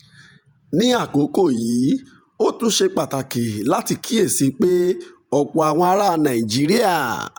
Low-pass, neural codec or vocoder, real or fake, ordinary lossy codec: none; vocoder, 48 kHz, 128 mel bands, Vocos; fake; none